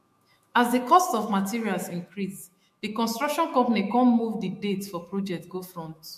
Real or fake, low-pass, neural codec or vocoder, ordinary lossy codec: fake; 14.4 kHz; autoencoder, 48 kHz, 128 numbers a frame, DAC-VAE, trained on Japanese speech; MP3, 64 kbps